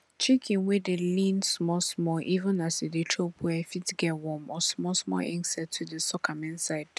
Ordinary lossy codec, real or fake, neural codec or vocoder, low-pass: none; real; none; none